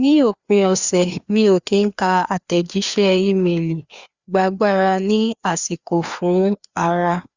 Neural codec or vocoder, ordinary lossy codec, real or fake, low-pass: codec, 16 kHz, 2 kbps, FreqCodec, larger model; Opus, 64 kbps; fake; 7.2 kHz